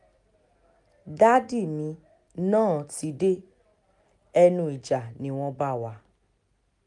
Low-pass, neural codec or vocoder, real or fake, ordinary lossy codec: 10.8 kHz; none; real; none